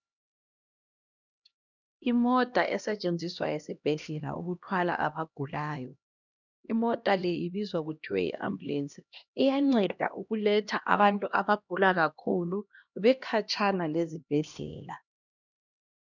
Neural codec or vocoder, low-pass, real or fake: codec, 16 kHz, 1 kbps, X-Codec, HuBERT features, trained on LibriSpeech; 7.2 kHz; fake